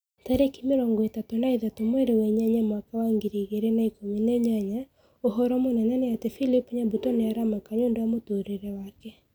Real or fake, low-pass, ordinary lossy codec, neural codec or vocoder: real; none; none; none